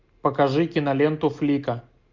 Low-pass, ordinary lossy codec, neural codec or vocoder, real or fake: 7.2 kHz; MP3, 64 kbps; none; real